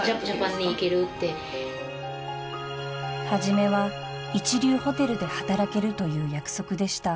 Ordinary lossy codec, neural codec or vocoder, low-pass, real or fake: none; none; none; real